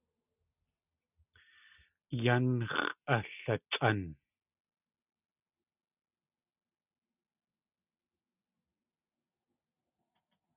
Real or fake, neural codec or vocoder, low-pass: real; none; 3.6 kHz